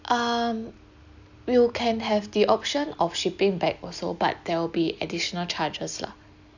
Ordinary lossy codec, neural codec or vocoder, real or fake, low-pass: none; none; real; 7.2 kHz